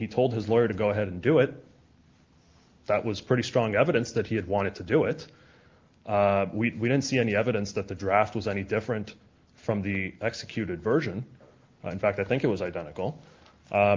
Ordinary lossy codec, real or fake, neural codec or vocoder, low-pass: Opus, 32 kbps; real; none; 7.2 kHz